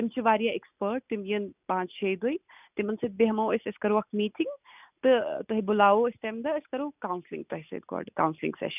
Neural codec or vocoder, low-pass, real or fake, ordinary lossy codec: none; 3.6 kHz; real; none